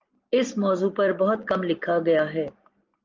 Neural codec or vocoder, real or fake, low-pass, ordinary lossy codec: none; real; 7.2 kHz; Opus, 32 kbps